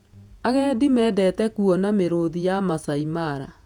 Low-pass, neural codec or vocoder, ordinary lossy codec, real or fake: 19.8 kHz; vocoder, 48 kHz, 128 mel bands, Vocos; none; fake